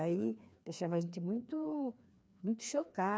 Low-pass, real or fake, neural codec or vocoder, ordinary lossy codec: none; fake; codec, 16 kHz, 2 kbps, FreqCodec, larger model; none